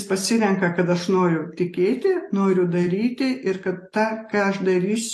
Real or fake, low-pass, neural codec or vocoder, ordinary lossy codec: real; 14.4 kHz; none; AAC, 48 kbps